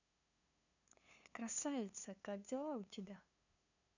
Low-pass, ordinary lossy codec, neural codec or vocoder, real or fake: 7.2 kHz; none; codec, 16 kHz, 2 kbps, FunCodec, trained on LibriTTS, 25 frames a second; fake